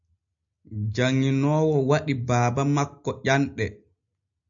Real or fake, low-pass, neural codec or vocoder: real; 7.2 kHz; none